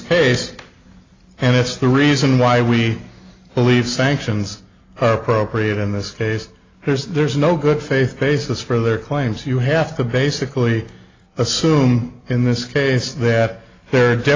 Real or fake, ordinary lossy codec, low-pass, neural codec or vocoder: real; AAC, 32 kbps; 7.2 kHz; none